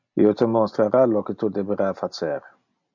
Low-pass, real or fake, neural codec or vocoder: 7.2 kHz; real; none